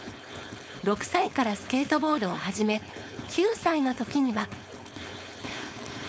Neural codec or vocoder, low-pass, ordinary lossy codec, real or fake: codec, 16 kHz, 4.8 kbps, FACodec; none; none; fake